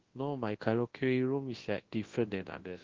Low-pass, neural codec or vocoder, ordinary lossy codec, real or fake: 7.2 kHz; codec, 24 kHz, 0.9 kbps, WavTokenizer, large speech release; Opus, 16 kbps; fake